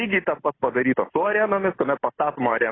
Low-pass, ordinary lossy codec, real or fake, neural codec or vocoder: 7.2 kHz; AAC, 16 kbps; real; none